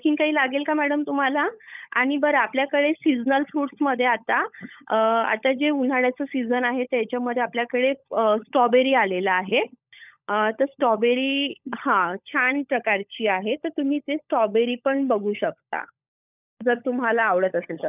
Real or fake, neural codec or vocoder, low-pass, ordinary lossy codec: fake; codec, 16 kHz, 16 kbps, FunCodec, trained on LibriTTS, 50 frames a second; 3.6 kHz; none